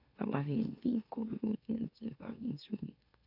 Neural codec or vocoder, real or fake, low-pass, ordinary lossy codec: autoencoder, 44.1 kHz, a latent of 192 numbers a frame, MeloTTS; fake; 5.4 kHz; none